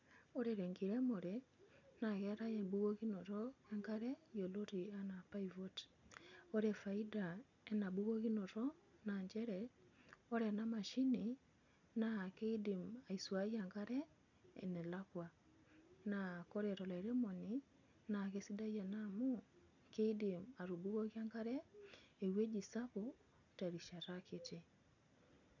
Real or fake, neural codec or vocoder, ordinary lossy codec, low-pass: real; none; none; 7.2 kHz